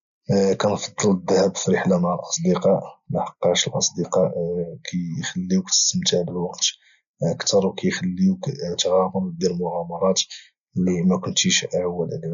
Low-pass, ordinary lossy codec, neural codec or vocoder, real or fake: 7.2 kHz; none; none; real